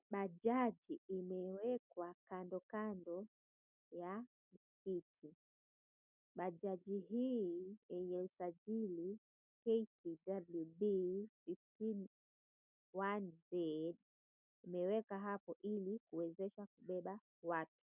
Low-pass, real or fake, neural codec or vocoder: 3.6 kHz; real; none